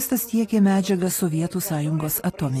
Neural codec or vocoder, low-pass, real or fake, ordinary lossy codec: none; 14.4 kHz; real; AAC, 48 kbps